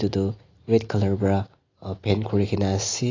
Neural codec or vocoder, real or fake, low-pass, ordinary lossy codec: none; real; 7.2 kHz; AAC, 32 kbps